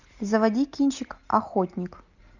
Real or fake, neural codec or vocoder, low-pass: real; none; 7.2 kHz